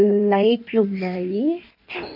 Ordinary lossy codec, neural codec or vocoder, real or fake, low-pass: none; codec, 16 kHz in and 24 kHz out, 1.1 kbps, FireRedTTS-2 codec; fake; 5.4 kHz